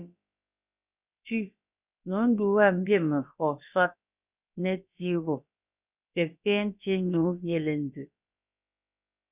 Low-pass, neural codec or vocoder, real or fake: 3.6 kHz; codec, 16 kHz, about 1 kbps, DyCAST, with the encoder's durations; fake